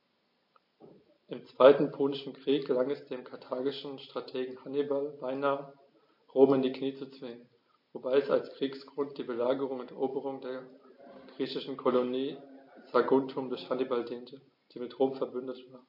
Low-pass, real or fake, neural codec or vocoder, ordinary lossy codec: 5.4 kHz; real; none; MP3, 32 kbps